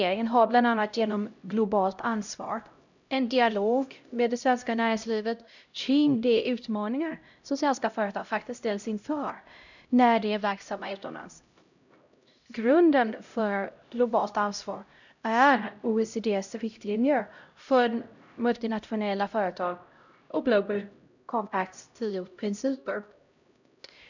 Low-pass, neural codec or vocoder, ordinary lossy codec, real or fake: 7.2 kHz; codec, 16 kHz, 0.5 kbps, X-Codec, HuBERT features, trained on LibriSpeech; none; fake